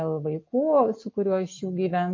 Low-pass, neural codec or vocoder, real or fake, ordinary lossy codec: 7.2 kHz; none; real; MP3, 32 kbps